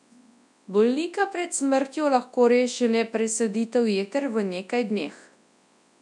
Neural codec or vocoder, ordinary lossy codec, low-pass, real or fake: codec, 24 kHz, 0.9 kbps, WavTokenizer, large speech release; none; 10.8 kHz; fake